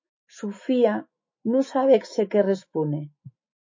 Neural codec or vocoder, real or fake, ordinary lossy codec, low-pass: autoencoder, 48 kHz, 128 numbers a frame, DAC-VAE, trained on Japanese speech; fake; MP3, 32 kbps; 7.2 kHz